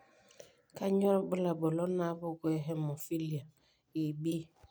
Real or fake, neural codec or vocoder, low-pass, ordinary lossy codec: real; none; none; none